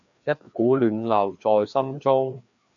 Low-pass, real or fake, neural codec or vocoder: 7.2 kHz; fake; codec, 16 kHz, 2 kbps, FreqCodec, larger model